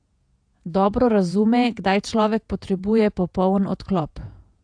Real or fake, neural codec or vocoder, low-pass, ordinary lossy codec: fake; vocoder, 48 kHz, 128 mel bands, Vocos; 9.9 kHz; AAC, 64 kbps